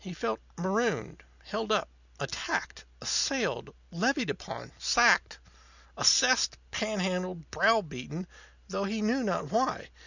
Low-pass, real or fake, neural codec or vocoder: 7.2 kHz; real; none